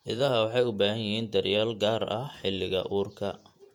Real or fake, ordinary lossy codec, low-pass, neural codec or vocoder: fake; MP3, 96 kbps; 19.8 kHz; vocoder, 48 kHz, 128 mel bands, Vocos